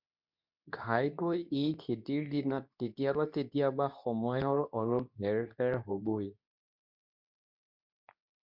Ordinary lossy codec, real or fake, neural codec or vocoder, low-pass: MP3, 48 kbps; fake; codec, 24 kHz, 0.9 kbps, WavTokenizer, medium speech release version 2; 5.4 kHz